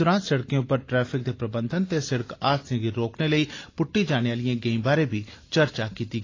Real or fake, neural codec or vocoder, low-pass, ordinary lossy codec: real; none; 7.2 kHz; AAC, 32 kbps